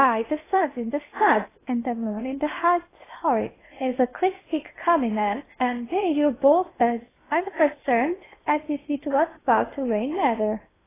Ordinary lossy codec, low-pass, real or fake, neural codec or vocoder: AAC, 16 kbps; 3.6 kHz; fake; codec, 16 kHz in and 24 kHz out, 0.8 kbps, FocalCodec, streaming, 65536 codes